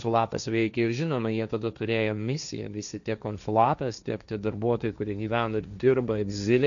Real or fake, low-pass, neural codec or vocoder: fake; 7.2 kHz; codec, 16 kHz, 1.1 kbps, Voila-Tokenizer